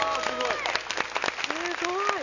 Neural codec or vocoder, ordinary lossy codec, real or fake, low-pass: none; none; real; 7.2 kHz